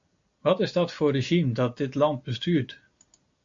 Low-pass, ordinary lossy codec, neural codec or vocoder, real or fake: 7.2 kHz; AAC, 48 kbps; none; real